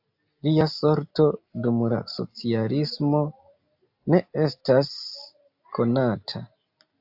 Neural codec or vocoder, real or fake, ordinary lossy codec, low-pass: none; real; AAC, 48 kbps; 5.4 kHz